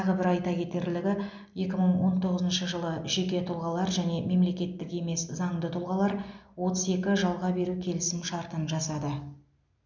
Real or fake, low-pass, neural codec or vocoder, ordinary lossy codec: real; 7.2 kHz; none; none